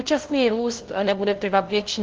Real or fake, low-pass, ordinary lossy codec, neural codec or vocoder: fake; 7.2 kHz; Opus, 16 kbps; codec, 16 kHz, 0.5 kbps, FunCodec, trained on LibriTTS, 25 frames a second